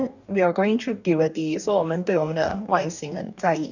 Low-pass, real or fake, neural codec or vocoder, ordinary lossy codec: 7.2 kHz; fake; codec, 44.1 kHz, 2.6 kbps, DAC; none